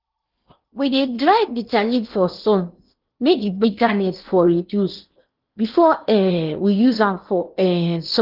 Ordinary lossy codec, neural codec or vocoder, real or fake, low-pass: Opus, 32 kbps; codec, 16 kHz in and 24 kHz out, 0.8 kbps, FocalCodec, streaming, 65536 codes; fake; 5.4 kHz